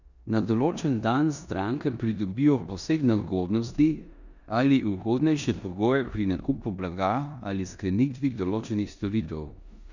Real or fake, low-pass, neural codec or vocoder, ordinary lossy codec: fake; 7.2 kHz; codec, 16 kHz in and 24 kHz out, 0.9 kbps, LongCat-Audio-Codec, four codebook decoder; none